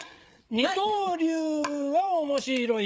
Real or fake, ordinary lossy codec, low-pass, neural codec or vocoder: fake; none; none; codec, 16 kHz, 8 kbps, FreqCodec, larger model